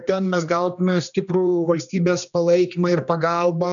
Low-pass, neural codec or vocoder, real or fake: 7.2 kHz; codec, 16 kHz, 2 kbps, X-Codec, HuBERT features, trained on general audio; fake